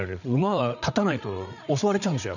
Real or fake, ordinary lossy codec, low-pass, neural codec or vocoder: fake; none; 7.2 kHz; codec, 16 kHz, 8 kbps, FreqCodec, larger model